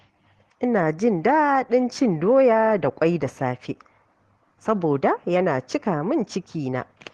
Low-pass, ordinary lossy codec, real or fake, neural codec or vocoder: 10.8 kHz; Opus, 16 kbps; real; none